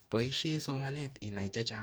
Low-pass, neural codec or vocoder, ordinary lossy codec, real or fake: none; codec, 44.1 kHz, 2.6 kbps, DAC; none; fake